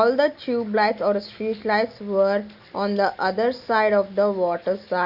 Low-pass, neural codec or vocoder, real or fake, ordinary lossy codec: 5.4 kHz; none; real; Opus, 64 kbps